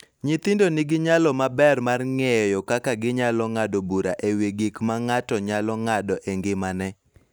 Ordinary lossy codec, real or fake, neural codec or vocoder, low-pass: none; real; none; none